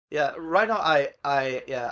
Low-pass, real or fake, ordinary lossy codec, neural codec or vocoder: none; fake; none; codec, 16 kHz, 4.8 kbps, FACodec